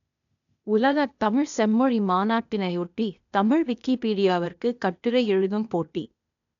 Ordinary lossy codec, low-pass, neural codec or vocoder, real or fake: none; 7.2 kHz; codec, 16 kHz, 0.8 kbps, ZipCodec; fake